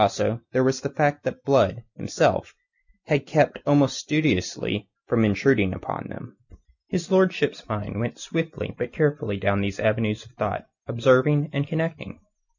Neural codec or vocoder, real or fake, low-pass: none; real; 7.2 kHz